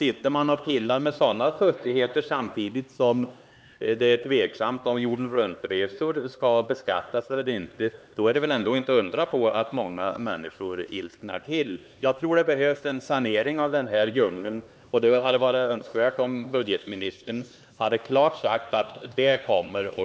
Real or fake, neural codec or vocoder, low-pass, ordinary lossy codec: fake; codec, 16 kHz, 2 kbps, X-Codec, HuBERT features, trained on LibriSpeech; none; none